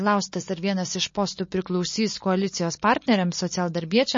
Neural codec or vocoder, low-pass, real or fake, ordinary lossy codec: none; 7.2 kHz; real; MP3, 32 kbps